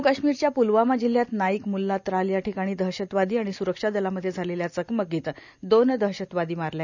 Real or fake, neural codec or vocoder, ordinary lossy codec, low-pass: real; none; none; 7.2 kHz